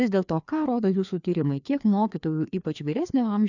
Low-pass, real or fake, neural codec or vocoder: 7.2 kHz; fake; codec, 16 kHz, 2 kbps, FreqCodec, larger model